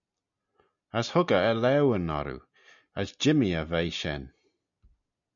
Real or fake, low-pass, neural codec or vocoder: real; 7.2 kHz; none